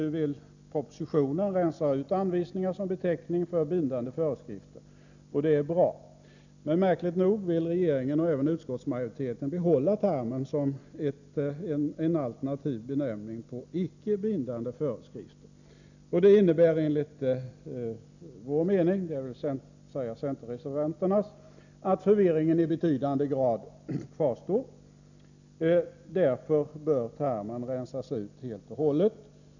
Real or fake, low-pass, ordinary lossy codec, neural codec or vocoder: real; 7.2 kHz; none; none